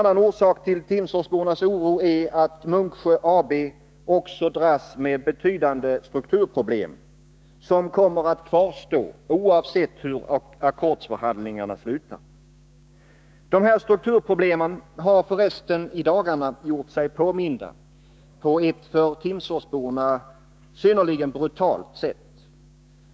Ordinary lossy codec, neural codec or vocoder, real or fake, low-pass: none; codec, 16 kHz, 6 kbps, DAC; fake; none